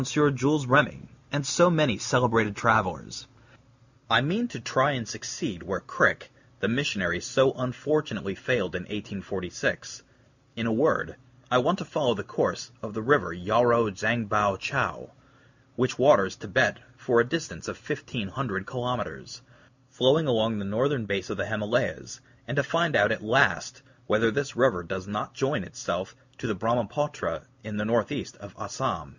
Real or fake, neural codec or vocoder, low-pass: real; none; 7.2 kHz